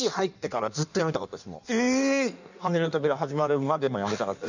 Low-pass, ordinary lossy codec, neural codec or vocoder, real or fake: 7.2 kHz; none; codec, 16 kHz in and 24 kHz out, 1.1 kbps, FireRedTTS-2 codec; fake